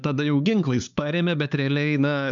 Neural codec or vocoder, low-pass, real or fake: codec, 16 kHz, 4 kbps, X-Codec, HuBERT features, trained on LibriSpeech; 7.2 kHz; fake